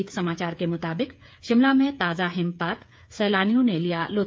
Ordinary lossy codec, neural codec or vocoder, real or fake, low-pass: none; codec, 16 kHz, 8 kbps, FreqCodec, smaller model; fake; none